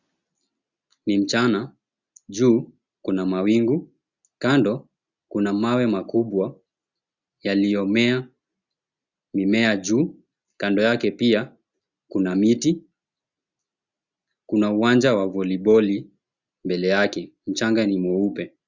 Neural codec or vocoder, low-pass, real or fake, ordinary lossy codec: none; 7.2 kHz; real; Opus, 64 kbps